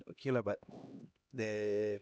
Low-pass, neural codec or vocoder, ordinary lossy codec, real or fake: none; codec, 16 kHz, 1 kbps, X-Codec, HuBERT features, trained on LibriSpeech; none; fake